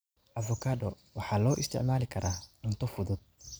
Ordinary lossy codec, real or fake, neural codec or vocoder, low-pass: none; real; none; none